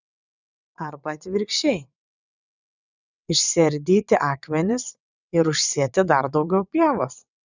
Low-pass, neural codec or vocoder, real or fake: 7.2 kHz; vocoder, 22.05 kHz, 80 mel bands, WaveNeXt; fake